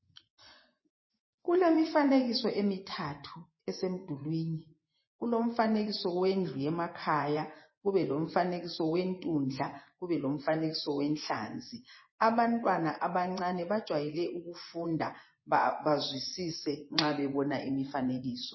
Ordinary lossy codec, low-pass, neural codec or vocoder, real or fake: MP3, 24 kbps; 7.2 kHz; vocoder, 24 kHz, 100 mel bands, Vocos; fake